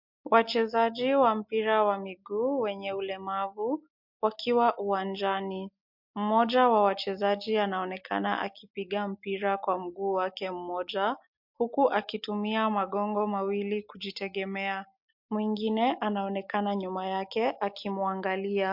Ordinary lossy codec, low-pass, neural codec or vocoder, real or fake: MP3, 48 kbps; 5.4 kHz; none; real